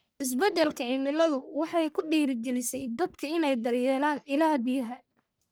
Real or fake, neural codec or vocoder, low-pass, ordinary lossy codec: fake; codec, 44.1 kHz, 1.7 kbps, Pupu-Codec; none; none